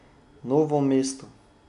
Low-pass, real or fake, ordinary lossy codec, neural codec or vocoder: 10.8 kHz; real; AAC, 96 kbps; none